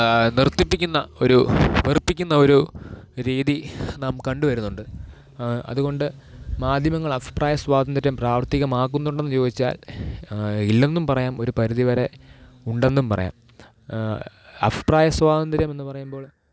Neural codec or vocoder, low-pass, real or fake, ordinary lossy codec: none; none; real; none